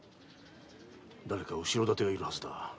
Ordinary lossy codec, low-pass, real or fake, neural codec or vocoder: none; none; real; none